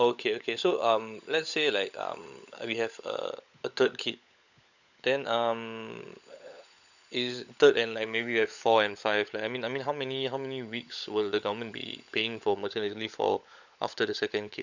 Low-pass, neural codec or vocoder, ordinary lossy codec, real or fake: 7.2 kHz; codec, 16 kHz, 16 kbps, FunCodec, trained on LibriTTS, 50 frames a second; none; fake